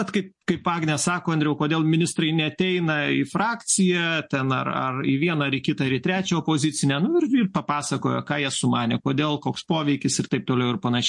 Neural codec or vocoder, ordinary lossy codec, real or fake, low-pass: none; MP3, 48 kbps; real; 10.8 kHz